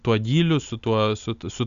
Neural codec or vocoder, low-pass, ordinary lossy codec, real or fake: none; 7.2 kHz; MP3, 96 kbps; real